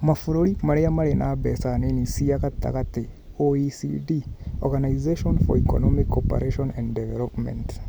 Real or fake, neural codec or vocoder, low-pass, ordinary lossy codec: real; none; none; none